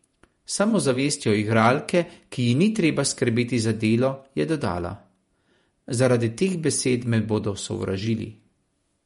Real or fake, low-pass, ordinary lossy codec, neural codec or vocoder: fake; 19.8 kHz; MP3, 48 kbps; vocoder, 48 kHz, 128 mel bands, Vocos